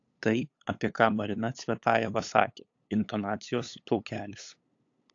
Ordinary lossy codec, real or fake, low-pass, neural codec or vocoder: AAC, 48 kbps; fake; 7.2 kHz; codec, 16 kHz, 8 kbps, FunCodec, trained on LibriTTS, 25 frames a second